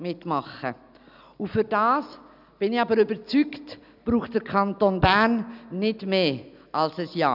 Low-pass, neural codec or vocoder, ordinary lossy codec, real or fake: 5.4 kHz; none; none; real